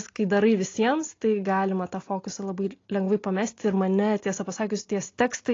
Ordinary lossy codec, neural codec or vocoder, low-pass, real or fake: AAC, 32 kbps; none; 7.2 kHz; real